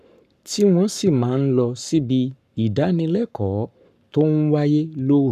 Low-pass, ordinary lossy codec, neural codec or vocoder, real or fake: 14.4 kHz; none; codec, 44.1 kHz, 7.8 kbps, Pupu-Codec; fake